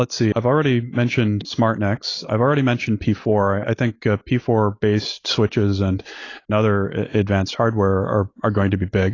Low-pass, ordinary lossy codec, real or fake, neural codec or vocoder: 7.2 kHz; AAC, 32 kbps; real; none